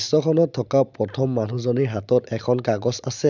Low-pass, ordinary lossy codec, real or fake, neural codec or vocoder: 7.2 kHz; none; real; none